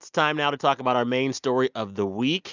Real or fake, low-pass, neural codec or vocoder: real; 7.2 kHz; none